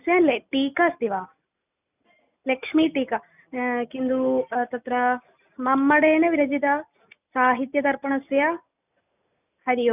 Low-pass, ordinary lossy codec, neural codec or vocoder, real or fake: 3.6 kHz; none; none; real